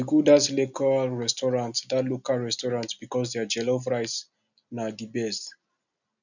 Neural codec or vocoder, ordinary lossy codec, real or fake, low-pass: none; none; real; 7.2 kHz